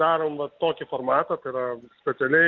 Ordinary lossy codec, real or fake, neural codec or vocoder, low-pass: Opus, 24 kbps; real; none; 7.2 kHz